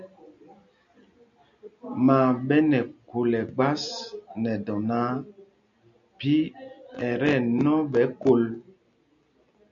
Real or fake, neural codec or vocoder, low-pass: real; none; 7.2 kHz